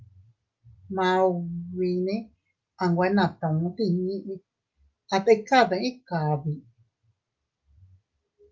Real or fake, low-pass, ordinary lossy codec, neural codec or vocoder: real; 7.2 kHz; Opus, 32 kbps; none